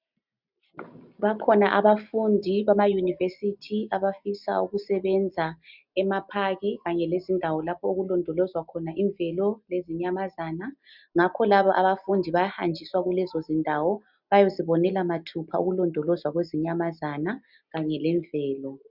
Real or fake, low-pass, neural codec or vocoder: real; 5.4 kHz; none